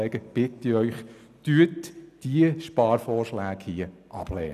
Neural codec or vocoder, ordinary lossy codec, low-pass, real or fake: none; none; 14.4 kHz; real